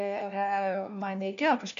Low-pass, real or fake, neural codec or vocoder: 7.2 kHz; fake; codec, 16 kHz, 1 kbps, FunCodec, trained on LibriTTS, 50 frames a second